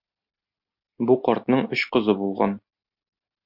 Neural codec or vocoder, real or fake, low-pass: none; real; 5.4 kHz